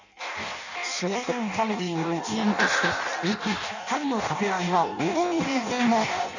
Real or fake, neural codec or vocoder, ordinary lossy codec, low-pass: fake; codec, 16 kHz in and 24 kHz out, 0.6 kbps, FireRedTTS-2 codec; none; 7.2 kHz